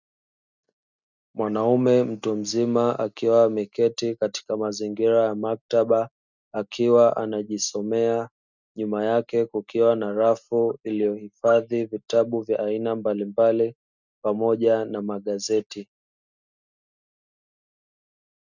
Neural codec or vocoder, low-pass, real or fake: none; 7.2 kHz; real